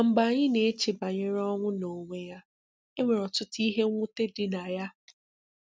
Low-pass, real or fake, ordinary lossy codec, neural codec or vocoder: none; real; none; none